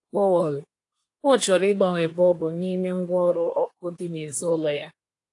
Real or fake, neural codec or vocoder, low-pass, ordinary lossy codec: fake; codec, 24 kHz, 1 kbps, SNAC; 10.8 kHz; AAC, 48 kbps